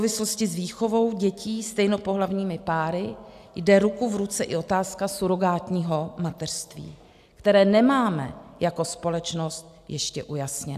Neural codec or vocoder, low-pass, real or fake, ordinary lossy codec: none; 14.4 kHz; real; AAC, 96 kbps